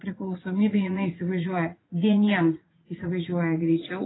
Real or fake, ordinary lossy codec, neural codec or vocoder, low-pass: fake; AAC, 16 kbps; vocoder, 44.1 kHz, 80 mel bands, Vocos; 7.2 kHz